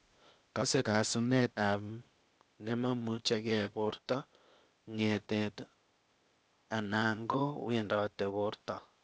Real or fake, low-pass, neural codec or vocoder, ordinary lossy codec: fake; none; codec, 16 kHz, 0.8 kbps, ZipCodec; none